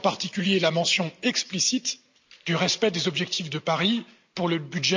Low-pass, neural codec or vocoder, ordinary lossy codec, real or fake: 7.2 kHz; none; MP3, 64 kbps; real